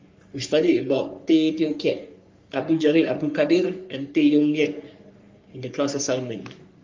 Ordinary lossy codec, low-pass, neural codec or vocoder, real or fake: Opus, 32 kbps; 7.2 kHz; codec, 44.1 kHz, 3.4 kbps, Pupu-Codec; fake